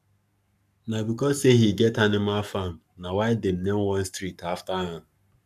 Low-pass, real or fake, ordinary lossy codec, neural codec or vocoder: 14.4 kHz; fake; none; codec, 44.1 kHz, 7.8 kbps, Pupu-Codec